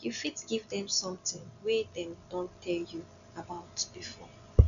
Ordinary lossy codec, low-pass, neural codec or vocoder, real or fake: none; 7.2 kHz; none; real